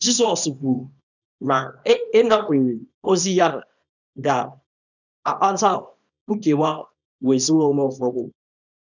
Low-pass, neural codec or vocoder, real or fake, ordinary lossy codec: 7.2 kHz; codec, 24 kHz, 0.9 kbps, WavTokenizer, small release; fake; none